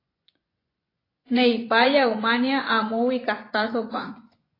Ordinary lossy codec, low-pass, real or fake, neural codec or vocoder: AAC, 24 kbps; 5.4 kHz; fake; vocoder, 24 kHz, 100 mel bands, Vocos